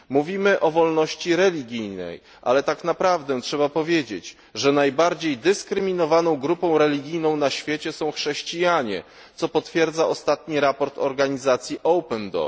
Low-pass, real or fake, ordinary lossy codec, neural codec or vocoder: none; real; none; none